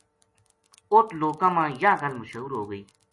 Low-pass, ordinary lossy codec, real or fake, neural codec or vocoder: 10.8 kHz; MP3, 48 kbps; real; none